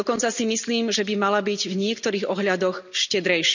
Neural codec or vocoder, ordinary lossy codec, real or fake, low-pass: none; none; real; 7.2 kHz